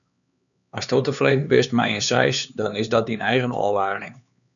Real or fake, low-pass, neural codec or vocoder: fake; 7.2 kHz; codec, 16 kHz, 4 kbps, X-Codec, HuBERT features, trained on LibriSpeech